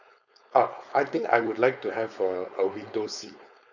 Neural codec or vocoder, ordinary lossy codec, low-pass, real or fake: codec, 16 kHz, 4.8 kbps, FACodec; none; 7.2 kHz; fake